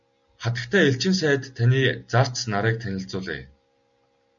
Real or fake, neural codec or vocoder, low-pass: real; none; 7.2 kHz